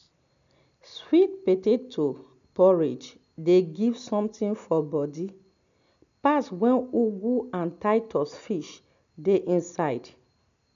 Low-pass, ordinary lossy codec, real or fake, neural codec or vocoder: 7.2 kHz; none; real; none